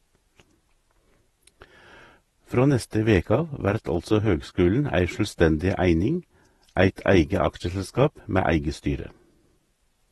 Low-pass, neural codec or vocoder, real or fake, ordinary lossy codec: 19.8 kHz; vocoder, 48 kHz, 128 mel bands, Vocos; fake; AAC, 32 kbps